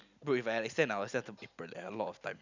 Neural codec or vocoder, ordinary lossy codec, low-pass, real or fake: none; none; 7.2 kHz; real